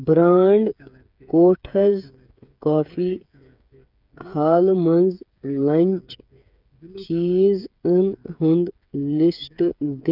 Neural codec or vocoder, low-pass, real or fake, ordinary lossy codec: codec, 16 kHz, 8 kbps, FreqCodec, smaller model; 5.4 kHz; fake; none